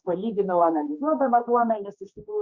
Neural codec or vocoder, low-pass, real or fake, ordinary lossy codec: codec, 16 kHz, 2 kbps, X-Codec, HuBERT features, trained on general audio; 7.2 kHz; fake; Opus, 64 kbps